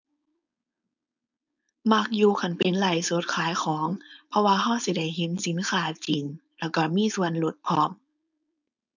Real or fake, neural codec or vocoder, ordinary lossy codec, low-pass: fake; codec, 16 kHz, 4.8 kbps, FACodec; none; 7.2 kHz